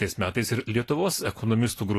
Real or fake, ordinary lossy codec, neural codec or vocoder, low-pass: real; AAC, 48 kbps; none; 14.4 kHz